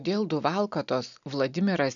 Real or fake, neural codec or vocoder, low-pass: real; none; 7.2 kHz